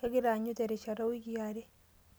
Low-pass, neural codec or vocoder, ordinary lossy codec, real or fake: none; none; none; real